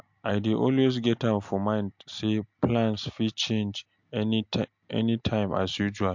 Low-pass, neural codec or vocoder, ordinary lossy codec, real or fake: 7.2 kHz; none; MP3, 64 kbps; real